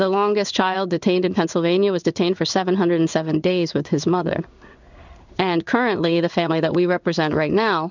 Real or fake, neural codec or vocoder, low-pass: fake; codec, 16 kHz in and 24 kHz out, 1 kbps, XY-Tokenizer; 7.2 kHz